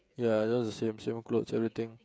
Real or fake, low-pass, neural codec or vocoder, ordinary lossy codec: fake; none; codec, 16 kHz, 16 kbps, FreqCodec, smaller model; none